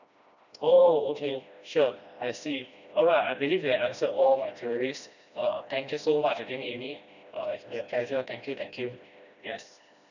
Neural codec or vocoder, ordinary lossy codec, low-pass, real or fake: codec, 16 kHz, 1 kbps, FreqCodec, smaller model; none; 7.2 kHz; fake